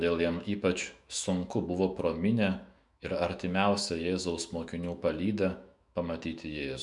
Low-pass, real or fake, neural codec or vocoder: 10.8 kHz; fake; autoencoder, 48 kHz, 128 numbers a frame, DAC-VAE, trained on Japanese speech